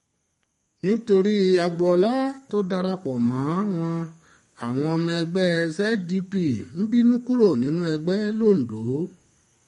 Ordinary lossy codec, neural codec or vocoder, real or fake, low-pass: MP3, 48 kbps; codec, 32 kHz, 1.9 kbps, SNAC; fake; 14.4 kHz